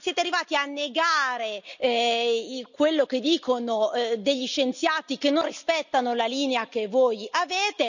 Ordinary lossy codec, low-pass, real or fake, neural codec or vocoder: none; 7.2 kHz; real; none